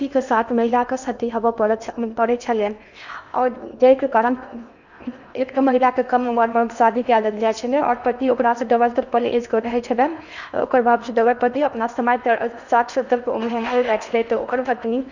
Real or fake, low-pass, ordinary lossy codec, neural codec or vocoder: fake; 7.2 kHz; none; codec, 16 kHz in and 24 kHz out, 0.8 kbps, FocalCodec, streaming, 65536 codes